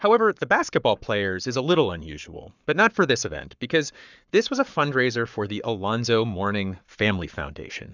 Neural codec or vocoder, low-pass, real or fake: codec, 44.1 kHz, 7.8 kbps, Pupu-Codec; 7.2 kHz; fake